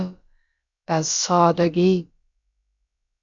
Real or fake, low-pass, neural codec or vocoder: fake; 7.2 kHz; codec, 16 kHz, about 1 kbps, DyCAST, with the encoder's durations